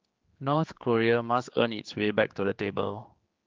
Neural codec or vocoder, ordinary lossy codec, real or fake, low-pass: codec, 16 kHz, 4 kbps, X-Codec, HuBERT features, trained on general audio; Opus, 32 kbps; fake; 7.2 kHz